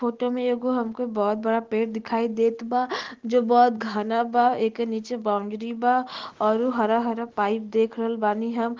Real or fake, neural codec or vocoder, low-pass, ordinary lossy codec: real; none; 7.2 kHz; Opus, 16 kbps